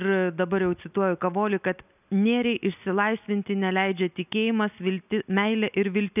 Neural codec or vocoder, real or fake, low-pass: none; real; 3.6 kHz